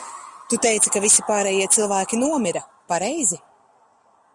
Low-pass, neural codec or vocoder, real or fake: 10.8 kHz; none; real